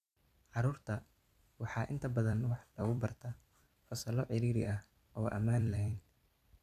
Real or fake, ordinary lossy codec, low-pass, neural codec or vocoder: fake; none; 14.4 kHz; vocoder, 44.1 kHz, 128 mel bands every 256 samples, BigVGAN v2